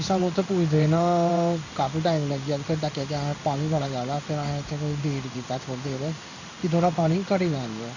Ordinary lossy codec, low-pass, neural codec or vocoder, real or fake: none; 7.2 kHz; codec, 16 kHz in and 24 kHz out, 1 kbps, XY-Tokenizer; fake